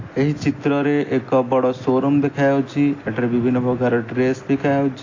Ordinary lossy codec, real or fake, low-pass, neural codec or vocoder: AAC, 32 kbps; real; 7.2 kHz; none